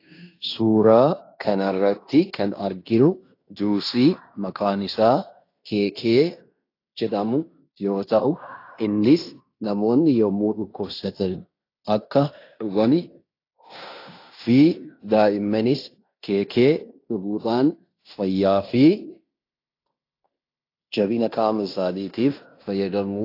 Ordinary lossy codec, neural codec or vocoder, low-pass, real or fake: AAC, 32 kbps; codec, 16 kHz in and 24 kHz out, 0.9 kbps, LongCat-Audio-Codec, fine tuned four codebook decoder; 5.4 kHz; fake